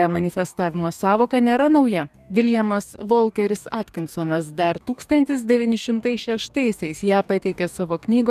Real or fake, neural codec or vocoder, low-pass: fake; codec, 44.1 kHz, 2.6 kbps, DAC; 14.4 kHz